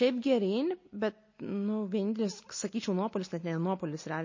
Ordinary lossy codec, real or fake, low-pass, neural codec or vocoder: MP3, 32 kbps; real; 7.2 kHz; none